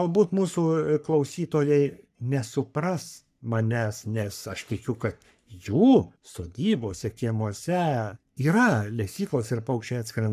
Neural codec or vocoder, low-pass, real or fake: codec, 44.1 kHz, 3.4 kbps, Pupu-Codec; 14.4 kHz; fake